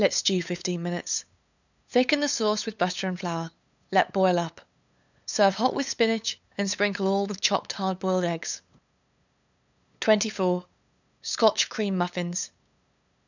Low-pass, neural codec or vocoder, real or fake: 7.2 kHz; codec, 16 kHz, 8 kbps, FunCodec, trained on LibriTTS, 25 frames a second; fake